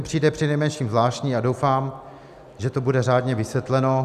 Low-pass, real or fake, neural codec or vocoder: 14.4 kHz; real; none